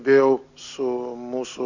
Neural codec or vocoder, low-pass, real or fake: none; 7.2 kHz; real